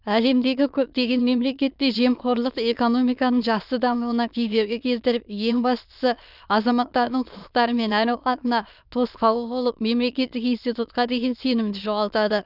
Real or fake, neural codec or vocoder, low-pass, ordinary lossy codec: fake; autoencoder, 22.05 kHz, a latent of 192 numbers a frame, VITS, trained on many speakers; 5.4 kHz; none